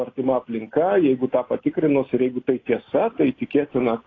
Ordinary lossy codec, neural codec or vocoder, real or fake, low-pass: AAC, 32 kbps; none; real; 7.2 kHz